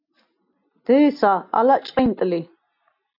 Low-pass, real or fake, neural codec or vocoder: 5.4 kHz; real; none